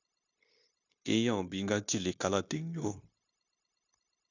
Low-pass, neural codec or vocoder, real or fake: 7.2 kHz; codec, 16 kHz, 0.9 kbps, LongCat-Audio-Codec; fake